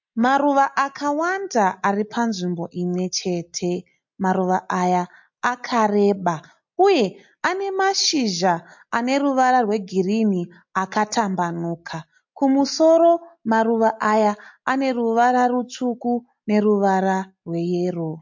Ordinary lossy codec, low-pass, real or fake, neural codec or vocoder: MP3, 48 kbps; 7.2 kHz; real; none